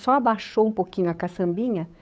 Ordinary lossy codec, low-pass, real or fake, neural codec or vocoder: none; none; fake; codec, 16 kHz, 8 kbps, FunCodec, trained on Chinese and English, 25 frames a second